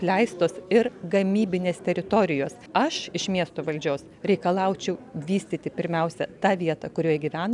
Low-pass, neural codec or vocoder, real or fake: 10.8 kHz; none; real